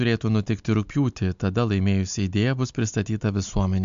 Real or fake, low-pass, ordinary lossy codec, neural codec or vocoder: real; 7.2 kHz; MP3, 64 kbps; none